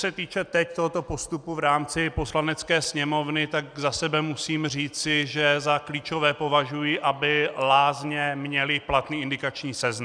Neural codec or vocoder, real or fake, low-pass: none; real; 9.9 kHz